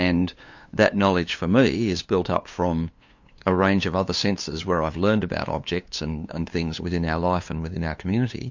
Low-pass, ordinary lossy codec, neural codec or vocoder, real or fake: 7.2 kHz; MP3, 48 kbps; codec, 16 kHz, 2 kbps, FunCodec, trained on LibriTTS, 25 frames a second; fake